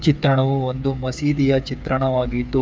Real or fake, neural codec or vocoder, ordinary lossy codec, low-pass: fake; codec, 16 kHz, 8 kbps, FreqCodec, smaller model; none; none